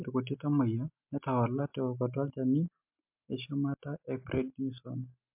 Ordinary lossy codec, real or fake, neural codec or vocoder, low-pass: MP3, 32 kbps; real; none; 3.6 kHz